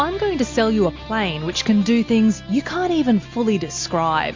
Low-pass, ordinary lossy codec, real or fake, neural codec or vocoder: 7.2 kHz; MP3, 48 kbps; real; none